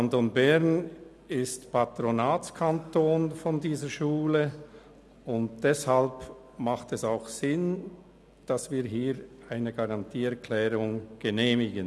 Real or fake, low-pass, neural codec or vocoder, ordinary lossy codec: real; none; none; none